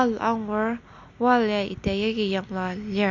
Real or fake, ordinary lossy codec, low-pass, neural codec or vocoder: real; none; 7.2 kHz; none